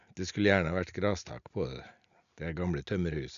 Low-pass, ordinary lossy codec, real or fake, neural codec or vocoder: 7.2 kHz; none; real; none